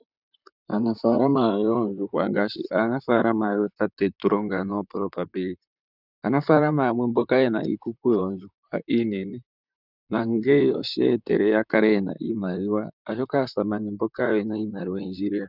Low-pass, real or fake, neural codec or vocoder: 5.4 kHz; fake; vocoder, 44.1 kHz, 128 mel bands, Pupu-Vocoder